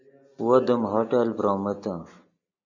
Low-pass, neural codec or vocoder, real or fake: 7.2 kHz; none; real